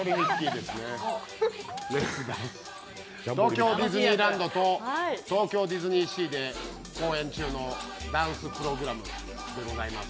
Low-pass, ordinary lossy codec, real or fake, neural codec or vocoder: none; none; real; none